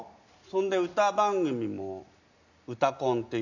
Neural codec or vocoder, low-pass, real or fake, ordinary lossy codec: none; 7.2 kHz; real; none